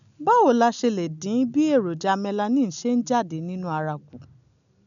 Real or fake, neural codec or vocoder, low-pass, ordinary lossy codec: real; none; 7.2 kHz; none